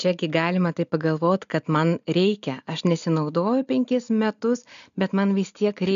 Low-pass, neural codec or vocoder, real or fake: 7.2 kHz; none; real